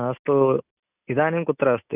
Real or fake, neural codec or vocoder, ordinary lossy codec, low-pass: real; none; none; 3.6 kHz